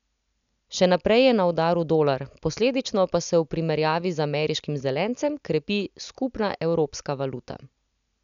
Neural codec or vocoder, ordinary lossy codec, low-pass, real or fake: none; none; 7.2 kHz; real